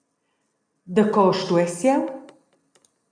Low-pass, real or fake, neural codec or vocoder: 9.9 kHz; real; none